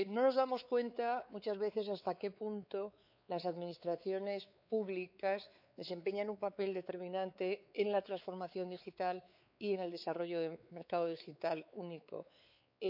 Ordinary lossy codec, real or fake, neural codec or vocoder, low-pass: none; fake; codec, 16 kHz, 4 kbps, X-Codec, WavLM features, trained on Multilingual LibriSpeech; 5.4 kHz